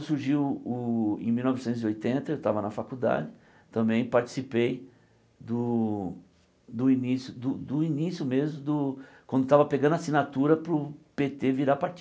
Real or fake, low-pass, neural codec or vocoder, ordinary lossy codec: real; none; none; none